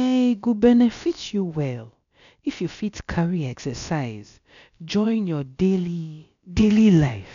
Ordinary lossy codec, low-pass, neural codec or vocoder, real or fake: none; 7.2 kHz; codec, 16 kHz, about 1 kbps, DyCAST, with the encoder's durations; fake